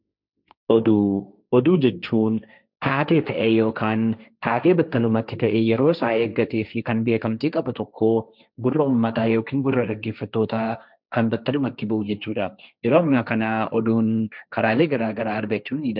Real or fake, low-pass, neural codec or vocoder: fake; 5.4 kHz; codec, 16 kHz, 1.1 kbps, Voila-Tokenizer